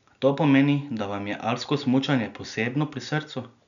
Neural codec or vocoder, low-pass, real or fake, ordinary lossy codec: none; 7.2 kHz; real; none